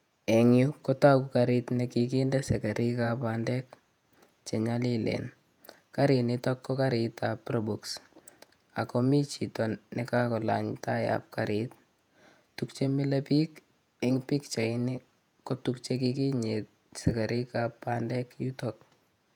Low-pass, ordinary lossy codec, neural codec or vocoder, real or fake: 19.8 kHz; none; vocoder, 48 kHz, 128 mel bands, Vocos; fake